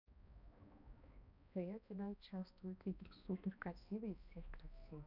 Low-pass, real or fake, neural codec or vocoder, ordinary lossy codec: 5.4 kHz; fake; codec, 16 kHz, 1 kbps, X-Codec, HuBERT features, trained on balanced general audio; none